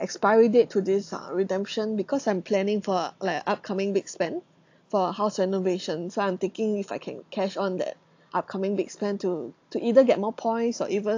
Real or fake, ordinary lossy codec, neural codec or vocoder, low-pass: real; AAC, 48 kbps; none; 7.2 kHz